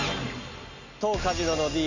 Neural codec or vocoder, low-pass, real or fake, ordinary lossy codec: none; 7.2 kHz; real; none